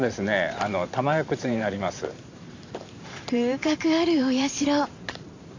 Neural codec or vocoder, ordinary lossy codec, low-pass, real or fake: vocoder, 44.1 kHz, 128 mel bands every 512 samples, BigVGAN v2; AAC, 48 kbps; 7.2 kHz; fake